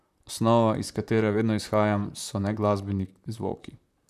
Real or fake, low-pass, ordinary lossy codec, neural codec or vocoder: fake; 14.4 kHz; none; vocoder, 44.1 kHz, 128 mel bands, Pupu-Vocoder